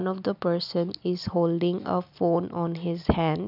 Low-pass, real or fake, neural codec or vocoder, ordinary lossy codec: 5.4 kHz; real; none; none